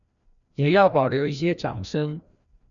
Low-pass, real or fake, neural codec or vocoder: 7.2 kHz; fake; codec, 16 kHz, 1 kbps, FreqCodec, larger model